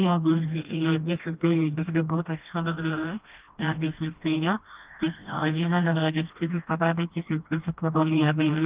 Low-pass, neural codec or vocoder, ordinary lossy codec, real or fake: 3.6 kHz; codec, 16 kHz, 1 kbps, FreqCodec, smaller model; Opus, 32 kbps; fake